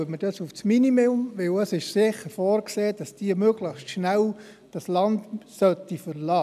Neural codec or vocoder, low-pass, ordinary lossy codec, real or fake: none; 14.4 kHz; none; real